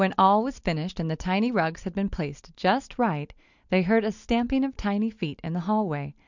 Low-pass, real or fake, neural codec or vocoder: 7.2 kHz; real; none